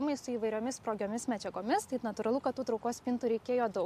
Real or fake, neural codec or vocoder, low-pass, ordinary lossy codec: real; none; 14.4 kHz; AAC, 96 kbps